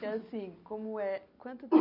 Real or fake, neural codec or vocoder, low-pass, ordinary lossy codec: real; none; 5.4 kHz; none